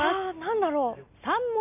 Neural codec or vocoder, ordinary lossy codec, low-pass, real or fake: none; none; 3.6 kHz; real